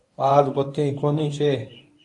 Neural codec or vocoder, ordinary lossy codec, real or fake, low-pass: codec, 24 kHz, 0.9 kbps, WavTokenizer, medium speech release version 1; AAC, 48 kbps; fake; 10.8 kHz